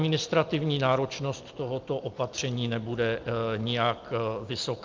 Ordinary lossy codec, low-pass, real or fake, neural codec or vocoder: Opus, 24 kbps; 7.2 kHz; real; none